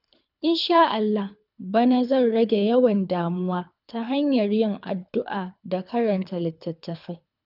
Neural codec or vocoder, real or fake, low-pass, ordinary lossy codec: codec, 24 kHz, 3 kbps, HILCodec; fake; 5.4 kHz; none